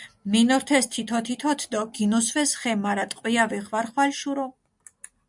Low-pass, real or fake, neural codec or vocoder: 10.8 kHz; real; none